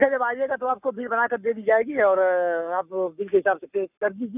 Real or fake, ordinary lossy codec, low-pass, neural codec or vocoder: fake; none; 3.6 kHz; codec, 44.1 kHz, 7.8 kbps, Pupu-Codec